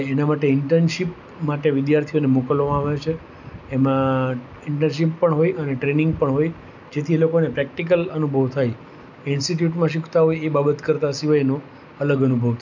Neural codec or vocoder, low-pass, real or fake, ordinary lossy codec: none; 7.2 kHz; real; none